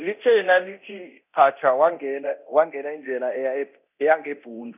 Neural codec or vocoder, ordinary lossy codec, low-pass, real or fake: codec, 24 kHz, 0.9 kbps, DualCodec; none; 3.6 kHz; fake